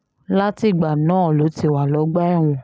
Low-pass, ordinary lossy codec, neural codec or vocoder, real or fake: none; none; none; real